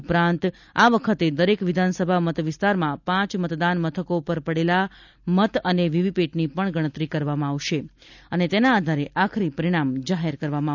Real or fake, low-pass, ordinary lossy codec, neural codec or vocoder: real; 7.2 kHz; none; none